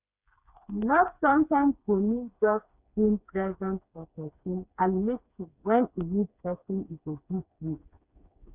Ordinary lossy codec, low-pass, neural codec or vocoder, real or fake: none; 3.6 kHz; codec, 16 kHz, 4 kbps, FreqCodec, smaller model; fake